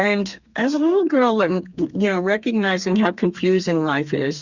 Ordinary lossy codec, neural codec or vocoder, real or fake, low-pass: Opus, 64 kbps; codec, 32 kHz, 1.9 kbps, SNAC; fake; 7.2 kHz